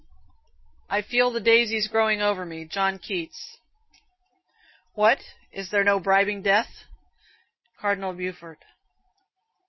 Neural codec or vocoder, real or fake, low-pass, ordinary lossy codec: none; real; 7.2 kHz; MP3, 24 kbps